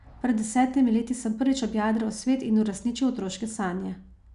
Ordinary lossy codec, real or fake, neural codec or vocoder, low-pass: none; real; none; 10.8 kHz